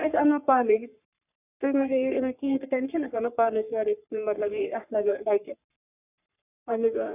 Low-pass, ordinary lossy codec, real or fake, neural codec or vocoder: 3.6 kHz; none; fake; codec, 44.1 kHz, 3.4 kbps, Pupu-Codec